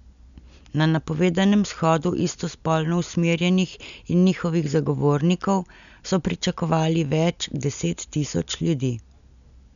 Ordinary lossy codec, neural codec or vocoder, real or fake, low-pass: none; none; real; 7.2 kHz